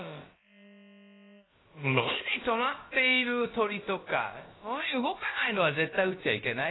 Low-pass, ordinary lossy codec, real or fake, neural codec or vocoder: 7.2 kHz; AAC, 16 kbps; fake; codec, 16 kHz, about 1 kbps, DyCAST, with the encoder's durations